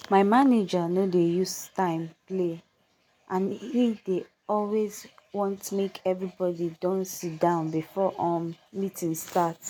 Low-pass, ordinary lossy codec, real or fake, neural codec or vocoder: none; none; real; none